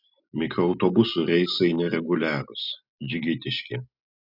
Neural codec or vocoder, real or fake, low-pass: none; real; 5.4 kHz